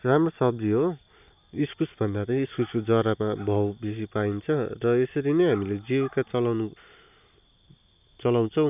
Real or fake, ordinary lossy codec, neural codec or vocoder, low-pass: real; none; none; 3.6 kHz